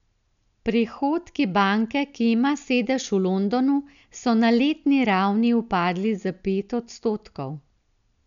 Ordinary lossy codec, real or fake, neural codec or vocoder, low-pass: none; real; none; 7.2 kHz